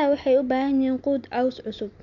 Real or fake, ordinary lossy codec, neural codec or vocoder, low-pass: real; MP3, 96 kbps; none; 7.2 kHz